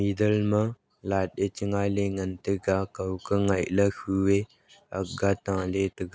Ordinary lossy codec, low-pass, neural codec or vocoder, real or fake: none; none; none; real